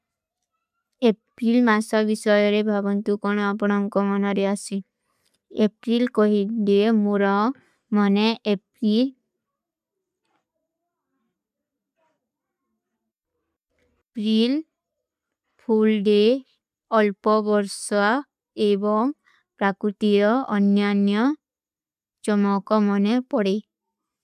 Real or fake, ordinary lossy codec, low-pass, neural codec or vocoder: real; none; 14.4 kHz; none